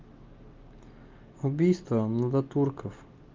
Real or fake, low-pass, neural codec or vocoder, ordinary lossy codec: real; 7.2 kHz; none; Opus, 32 kbps